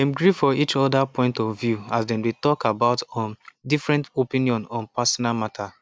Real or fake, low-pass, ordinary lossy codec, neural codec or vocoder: real; none; none; none